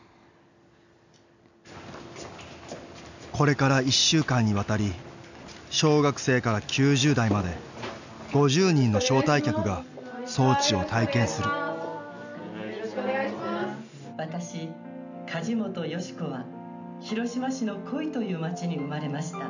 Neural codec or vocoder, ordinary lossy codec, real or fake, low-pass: none; none; real; 7.2 kHz